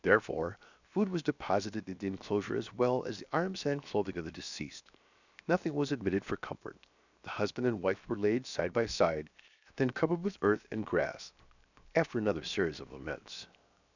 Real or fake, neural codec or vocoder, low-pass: fake; codec, 16 kHz, 0.7 kbps, FocalCodec; 7.2 kHz